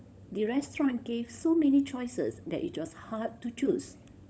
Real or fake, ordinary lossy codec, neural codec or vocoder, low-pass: fake; none; codec, 16 kHz, 16 kbps, FunCodec, trained on LibriTTS, 50 frames a second; none